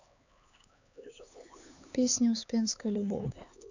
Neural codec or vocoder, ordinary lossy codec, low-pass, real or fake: codec, 16 kHz, 4 kbps, X-Codec, HuBERT features, trained on LibriSpeech; none; 7.2 kHz; fake